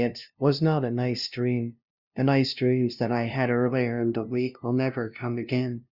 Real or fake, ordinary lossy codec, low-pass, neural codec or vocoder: fake; Opus, 64 kbps; 5.4 kHz; codec, 16 kHz, 0.5 kbps, FunCodec, trained on LibriTTS, 25 frames a second